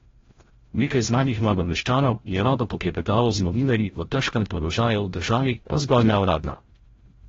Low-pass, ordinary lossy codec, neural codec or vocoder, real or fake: 7.2 kHz; AAC, 24 kbps; codec, 16 kHz, 0.5 kbps, FreqCodec, larger model; fake